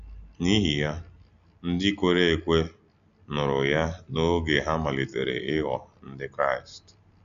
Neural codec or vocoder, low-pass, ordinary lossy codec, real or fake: none; 7.2 kHz; none; real